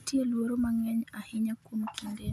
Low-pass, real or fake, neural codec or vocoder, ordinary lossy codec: 14.4 kHz; real; none; none